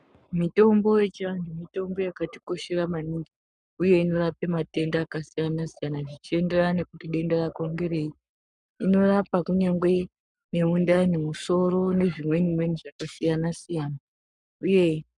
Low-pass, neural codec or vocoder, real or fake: 10.8 kHz; codec, 44.1 kHz, 7.8 kbps, Pupu-Codec; fake